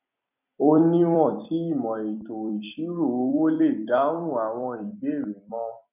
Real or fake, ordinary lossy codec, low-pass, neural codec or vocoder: fake; none; 3.6 kHz; vocoder, 44.1 kHz, 128 mel bands every 256 samples, BigVGAN v2